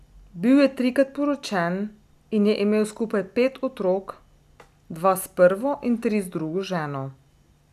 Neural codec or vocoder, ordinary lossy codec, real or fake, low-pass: none; none; real; 14.4 kHz